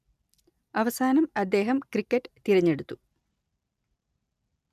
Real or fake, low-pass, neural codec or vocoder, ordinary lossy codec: real; 14.4 kHz; none; none